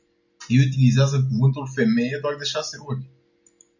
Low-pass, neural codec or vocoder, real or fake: 7.2 kHz; none; real